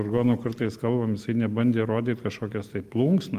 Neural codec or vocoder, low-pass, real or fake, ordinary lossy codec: none; 14.4 kHz; real; Opus, 24 kbps